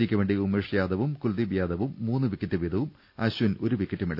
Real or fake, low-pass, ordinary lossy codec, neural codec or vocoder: real; 5.4 kHz; none; none